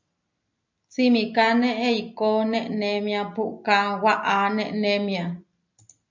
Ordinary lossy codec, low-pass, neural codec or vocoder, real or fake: MP3, 64 kbps; 7.2 kHz; none; real